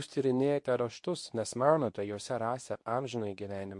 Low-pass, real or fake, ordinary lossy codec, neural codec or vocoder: 10.8 kHz; fake; MP3, 48 kbps; codec, 24 kHz, 0.9 kbps, WavTokenizer, medium speech release version 2